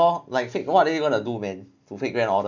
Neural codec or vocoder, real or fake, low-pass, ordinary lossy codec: none; real; 7.2 kHz; none